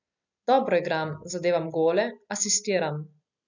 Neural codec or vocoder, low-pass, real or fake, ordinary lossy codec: none; 7.2 kHz; real; none